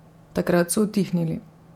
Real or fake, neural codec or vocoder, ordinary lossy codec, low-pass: fake; vocoder, 48 kHz, 128 mel bands, Vocos; MP3, 96 kbps; 19.8 kHz